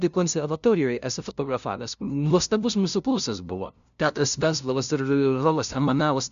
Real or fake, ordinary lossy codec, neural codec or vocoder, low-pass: fake; MP3, 96 kbps; codec, 16 kHz, 0.5 kbps, FunCodec, trained on LibriTTS, 25 frames a second; 7.2 kHz